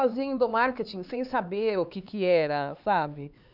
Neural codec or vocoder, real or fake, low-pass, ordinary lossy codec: codec, 16 kHz, 4 kbps, X-Codec, HuBERT features, trained on LibriSpeech; fake; 5.4 kHz; none